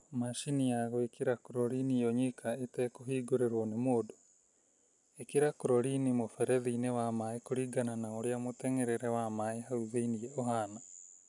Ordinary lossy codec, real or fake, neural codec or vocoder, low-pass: none; real; none; 10.8 kHz